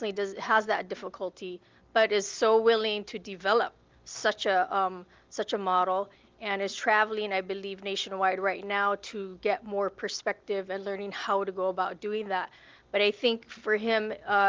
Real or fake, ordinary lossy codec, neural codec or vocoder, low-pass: real; Opus, 32 kbps; none; 7.2 kHz